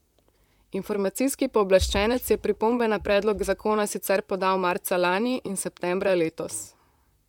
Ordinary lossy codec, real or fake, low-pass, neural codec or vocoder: MP3, 96 kbps; fake; 19.8 kHz; vocoder, 44.1 kHz, 128 mel bands, Pupu-Vocoder